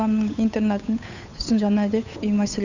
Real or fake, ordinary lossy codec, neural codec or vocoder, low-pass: fake; none; codec, 16 kHz, 8 kbps, FunCodec, trained on Chinese and English, 25 frames a second; 7.2 kHz